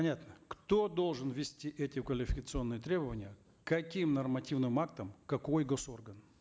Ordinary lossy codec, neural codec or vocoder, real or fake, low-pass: none; none; real; none